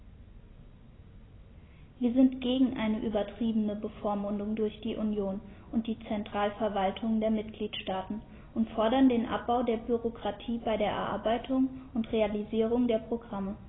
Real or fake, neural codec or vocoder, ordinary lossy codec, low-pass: real; none; AAC, 16 kbps; 7.2 kHz